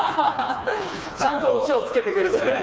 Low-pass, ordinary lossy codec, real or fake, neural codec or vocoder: none; none; fake; codec, 16 kHz, 2 kbps, FreqCodec, smaller model